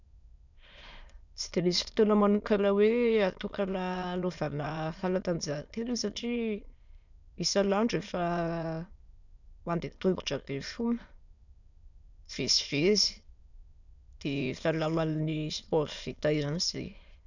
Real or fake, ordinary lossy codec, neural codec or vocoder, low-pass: fake; none; autoencoder, 22.05 kHz, a latent of 192 numbers a frame, VITS, trained on many speakers; 7.2 kHz